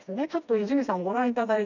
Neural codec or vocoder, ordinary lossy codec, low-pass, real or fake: codec, 16 kHz, 1 kbps, FreqCodec, smaller model; none; 7.2 kHz; fake